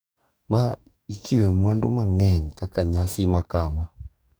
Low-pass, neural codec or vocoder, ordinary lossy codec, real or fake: none; codec, 44.1 kHz, 2.6 kbps, DAC; none; fake